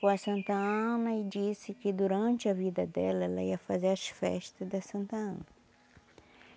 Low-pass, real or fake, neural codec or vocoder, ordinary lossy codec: none; real; none; none